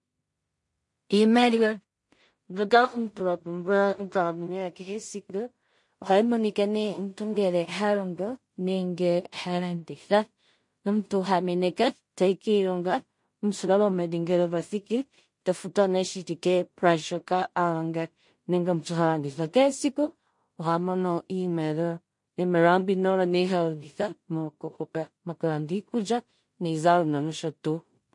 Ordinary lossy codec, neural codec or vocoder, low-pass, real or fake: MP3, 48 kbps; codec, 16 kHz in and 24 kHz out, 0.4 kbps, LongCat-Audio-Codec, two codebook decoder; 10.8 kHz; fake